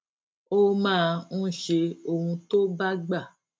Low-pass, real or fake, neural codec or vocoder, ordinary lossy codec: none; real; none; none